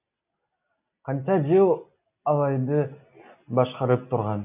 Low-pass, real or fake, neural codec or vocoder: 3.6 kHz; real; none